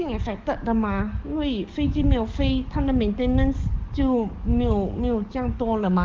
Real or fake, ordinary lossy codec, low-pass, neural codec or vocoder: fake; Opus, 32 kbps; 7.2 kHz; codec, 44.1 kHz, 7.8 kbps, DAC